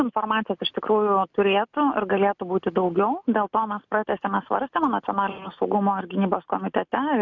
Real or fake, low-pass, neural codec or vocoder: real; 7.2 kHz; none